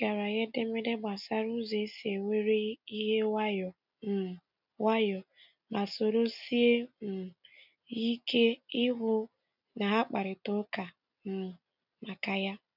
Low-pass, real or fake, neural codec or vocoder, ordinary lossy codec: 5.4 kHz; real; none; none